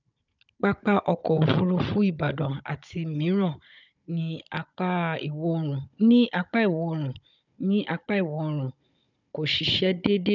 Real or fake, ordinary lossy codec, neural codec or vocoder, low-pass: fake; none; codec, 16 kHz, 16 kbps, FunCodec, trained on Chinese and English, 50 frames a second; 7.2 kHz